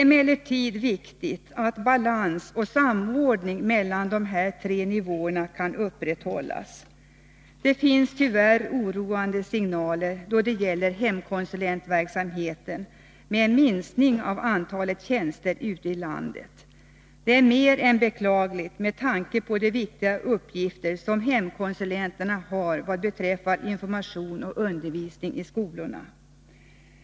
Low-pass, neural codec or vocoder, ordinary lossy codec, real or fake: none; none; none; real